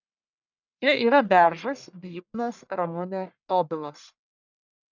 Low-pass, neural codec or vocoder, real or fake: 7.2 kHz; codec, 44.1 kHz, 1.7 kbps, Pupu-Codec; fake